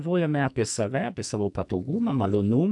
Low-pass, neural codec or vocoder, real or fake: 10.8 kHz; codec, 44.1 kHz, 2.6 kbps, SNAC; fake